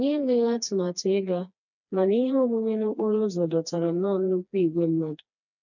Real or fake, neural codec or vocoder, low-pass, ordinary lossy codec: fake; codec, 16 kHz, 2 kbps, FreqCodec, smaller model; 7.2 kHz; none